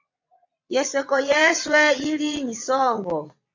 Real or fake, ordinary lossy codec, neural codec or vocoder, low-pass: fake; AAC, 48 kbps; vocoder, 22.05 kHz, 80 mel bands, WaveNeXt; 7.2 kHz